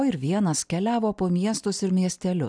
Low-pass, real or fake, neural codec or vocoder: 9.9 kHz; real; none